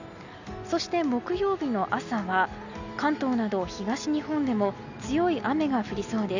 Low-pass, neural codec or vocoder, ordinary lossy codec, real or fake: 7.2 kHz; none; none; real